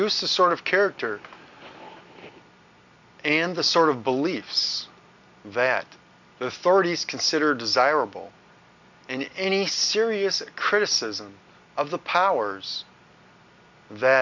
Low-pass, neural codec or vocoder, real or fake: 7.2 kHz; none; real